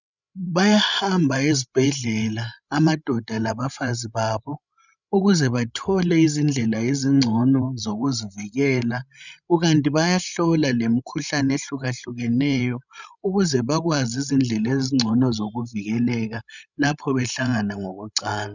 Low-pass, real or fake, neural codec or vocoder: 7.2 kHz; fake; codec, 16 kHz, 16 kbps, FreqCodec, larger model